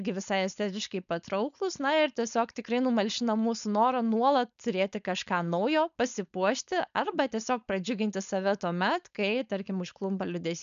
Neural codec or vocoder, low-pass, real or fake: codec, 16 kHz, 4.8 kbps, FACodec; 7.2 kHz; fake